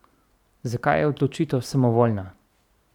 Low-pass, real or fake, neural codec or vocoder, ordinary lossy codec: 19.8 kHz; fake; vocoder, 44.1 kHz, 128 mel bands every 512 samples, BigVGAN v2; none